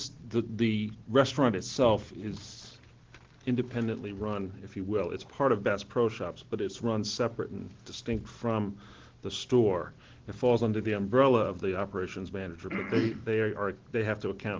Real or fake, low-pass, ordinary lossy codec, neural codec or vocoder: real; 7.2 kHz; Opus, 16 kbps; none